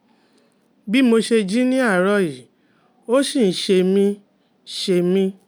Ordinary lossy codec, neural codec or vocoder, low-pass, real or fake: none; none; none; real